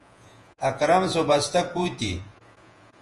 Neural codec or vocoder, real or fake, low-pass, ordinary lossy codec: vocoder, 48 kHz, 128 mel bands, Vocos; fake; 10.8 kHz; Opus, 32 kbps